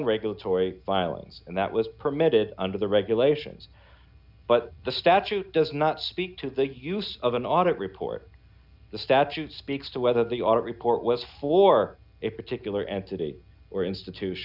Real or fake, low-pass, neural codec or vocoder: real; 5.4 kHz; none